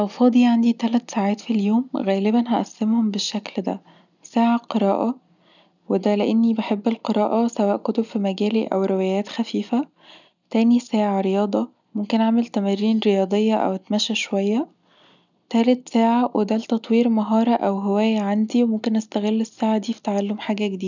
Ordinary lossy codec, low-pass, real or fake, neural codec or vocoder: none; 7.2 kHz; real; none